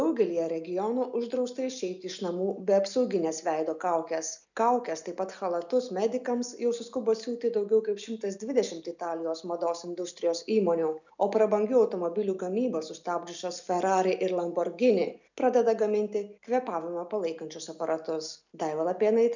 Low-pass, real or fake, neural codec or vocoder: 7.2 kHz; real; none